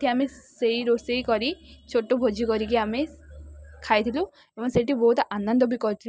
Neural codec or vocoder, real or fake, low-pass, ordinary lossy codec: none; real; none; none